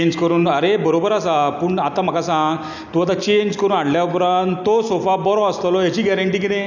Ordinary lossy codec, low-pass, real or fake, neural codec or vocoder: none; 7.2 kHz; real; none